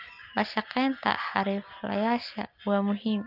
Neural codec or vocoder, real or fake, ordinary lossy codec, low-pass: none; real; Opus, 24 kbps; 5.4 kHz